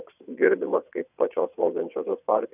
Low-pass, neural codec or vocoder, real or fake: 3.6 kHz; vocoder, 22.05 kHz, 80 mel bands, WaveNeXt; fake